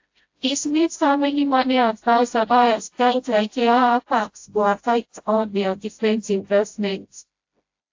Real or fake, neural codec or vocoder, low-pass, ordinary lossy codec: fake; codec, 16 kHz, 0.5 kbps, FreqCodec, smaller model; 7.2 kHz; AAC, 48 kbps